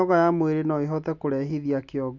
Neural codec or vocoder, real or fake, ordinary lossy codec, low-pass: none; real; none; 7.2 kHz